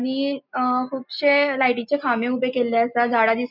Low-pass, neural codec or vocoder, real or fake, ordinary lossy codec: 5.4 kHz; none; real; none